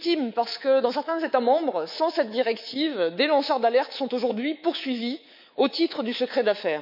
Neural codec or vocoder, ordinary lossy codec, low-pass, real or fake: codec, 24 kHz, 3.1 kbps, DualCodec; none; 5.4 kHz; fake